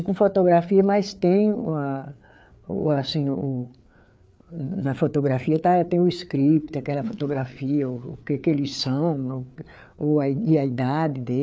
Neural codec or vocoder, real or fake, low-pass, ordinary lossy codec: codec, 16 kHz, 4 kbps, FreqCodec, larger model; fake; none; none